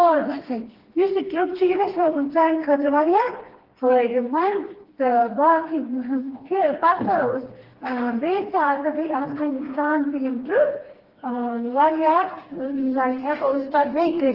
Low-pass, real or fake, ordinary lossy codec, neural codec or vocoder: 5.4 kHz; fake; Opus, 16 kbps; codec, 16 kHz, 2 kbps, FreqCodec, smaller model